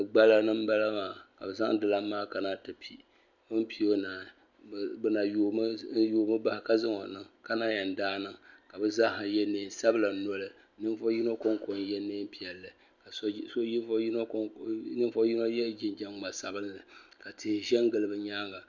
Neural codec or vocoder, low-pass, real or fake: none; 7.2 kHz; real